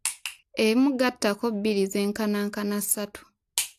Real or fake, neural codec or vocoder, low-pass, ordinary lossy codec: real; none; 14.4 kHz; AAC, 64 kbps